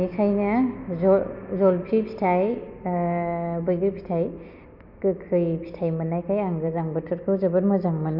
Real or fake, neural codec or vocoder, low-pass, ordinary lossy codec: real; none; 5.4 kHz; MP3, 48 kbps